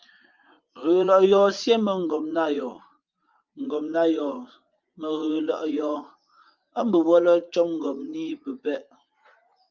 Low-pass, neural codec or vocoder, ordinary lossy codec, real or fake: 7.2 kHz; vocoder, 44.1 kHz, 80 mel bands, Vocos; Opus, 24 kbps; fake